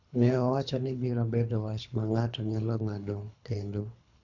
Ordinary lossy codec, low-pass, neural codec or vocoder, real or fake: none; 7.2 kHz; codec, 24 kHz, 3 kbps, HILCodec; fake